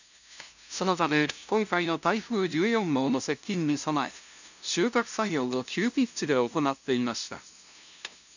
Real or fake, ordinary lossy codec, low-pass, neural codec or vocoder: fake; none; 7.2 kHz; codec, 16 kHz, 0.5 kbps, FunCodec, trained on LibriTTS, 25 frames a second